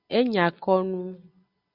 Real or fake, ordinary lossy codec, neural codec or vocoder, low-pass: real; Opus, 64 kbps; none; 5.4 kHz